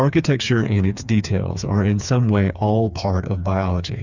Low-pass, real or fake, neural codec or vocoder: 7.2 kHz; fake; codec, 16 kHz, 4 kbps, FreqCodec, smaller model